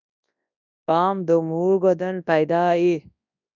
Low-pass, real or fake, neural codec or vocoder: 7.2 kHz; fake; codec, 24 kHz, 0.9 kbps, WavTokenizer, large speech release